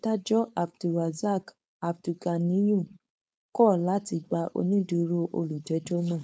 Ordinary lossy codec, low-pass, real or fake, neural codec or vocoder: none; none; fake; codec, 16 kHz, 4.8 kbps, FACodec